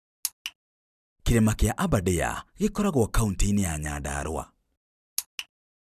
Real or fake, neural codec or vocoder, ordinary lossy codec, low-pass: fake; vocoder, 44.1 kHz, 128 mel bands every 256 samples, BigVGAN v2; none; 14.4 kHz